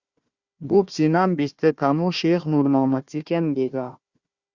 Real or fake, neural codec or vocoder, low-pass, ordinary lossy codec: fake; codec, 16 kHz, 1 kbps, FunCodec, trained on Chinese and English, 50 frames a second; 7.2 kHz; Opus, 64 kbps